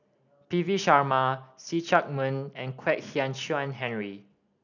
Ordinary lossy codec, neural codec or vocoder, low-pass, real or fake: none; none; 7.2 kHz; real